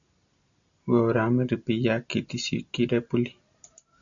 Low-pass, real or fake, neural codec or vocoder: 7.2 kHz; real; none